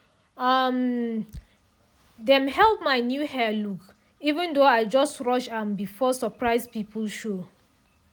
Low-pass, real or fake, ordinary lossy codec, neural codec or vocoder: none; real; none; none